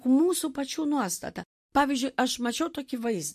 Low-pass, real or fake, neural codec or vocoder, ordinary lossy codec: 14.4 kHz; real; none; MP3, 64 kbps